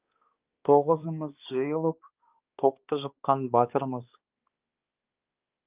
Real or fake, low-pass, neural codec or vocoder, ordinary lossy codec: fake; 3.6 kHz; codec, 16 kHz, 2 kbps, X-Codec, HuBERT features, trained on balanced general audio; Opus, 24 kbps